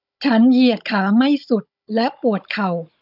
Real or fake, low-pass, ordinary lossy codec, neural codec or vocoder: fake; 5.4 kHz; none; codec, 16 kHz, 16 kbps, FunCodec, trained on Chinese and English, 50 frames a second